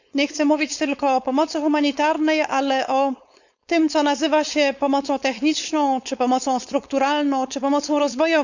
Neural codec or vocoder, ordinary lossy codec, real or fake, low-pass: codec, 16 kHz, 4.8 kbps, FACodec; none; fake; 7.2 kHz